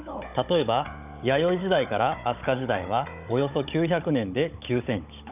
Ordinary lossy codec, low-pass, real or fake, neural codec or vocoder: none; 3.6 kHz; fake; codec, 16 kHz, 16 kbps, FunCodec, trained on Chinese and English, 50 frames a second